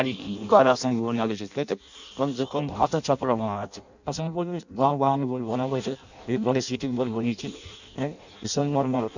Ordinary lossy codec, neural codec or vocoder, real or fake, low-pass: none; codec, 16 kHz in and 24 kHz out, 0.6 kbps, FireRedTTS-2 codec; fake; 7.2 kHz